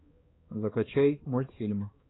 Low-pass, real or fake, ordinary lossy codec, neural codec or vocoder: 7.2 kHz; fake; AAC, 16 kbps; codec, 16 kHz, 2 kbps, X-Codec, HuBERT features, trained on balanced general audio